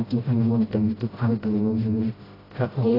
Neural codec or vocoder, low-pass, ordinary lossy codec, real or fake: codec, 16 kHz, 0.5 kbps, FreqCodec, smaller model; 5.4 kHz; AAC, 24 kbps; fake